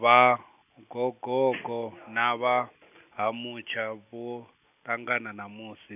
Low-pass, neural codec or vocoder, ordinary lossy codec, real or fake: 3.6 kHz; none; none; real